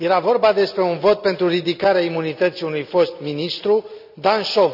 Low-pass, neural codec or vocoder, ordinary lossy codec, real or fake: 5.4 kHz; none; none; real